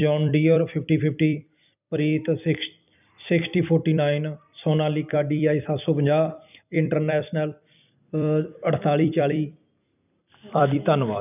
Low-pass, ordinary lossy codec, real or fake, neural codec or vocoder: 3.6 kHz; none; fake; vocoder, 44.1 kHz, 128 mel bands every 256 samples, BigVGAN v2